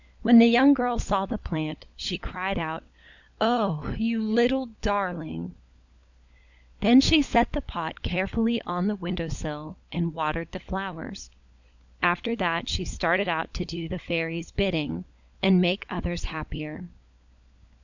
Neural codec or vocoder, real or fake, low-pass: codec, 16 kHz, 16 kbps, FunCodec, trained on LibriTTS, 50 frames a second; fake; 7.2 kHz